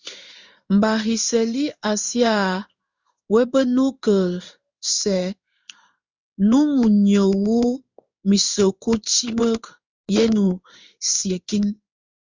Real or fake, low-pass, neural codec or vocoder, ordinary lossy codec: fake; 7.2 kHz; codec, 16 kHz in and 24 kHz out, 1 kbps, XY-Tokenizer; Opus, 64 kbps